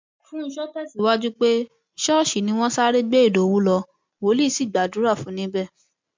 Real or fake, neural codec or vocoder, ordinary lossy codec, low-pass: real; none; MP3, 48 kbps; 7.2 kHz